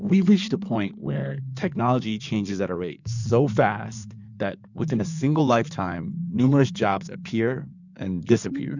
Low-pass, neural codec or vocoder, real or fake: 7.2 kHz; codec, 16 kHz in and 24 kHz out, 2.2 kbps, FireRedTTS-2 codec; fake